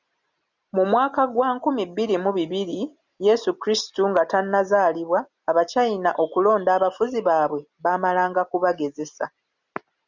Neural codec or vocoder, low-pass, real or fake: none; 7.2 kHz; real